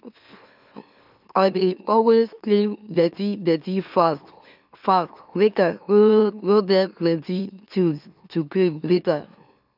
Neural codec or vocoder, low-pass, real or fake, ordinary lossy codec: autoencoder, 44.1 kHz, a latent of 192 numbers a frame, MeloTTS; 5.4 kHz; fake; none